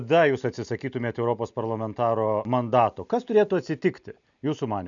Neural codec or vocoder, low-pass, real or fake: none; 7.2 kHz; real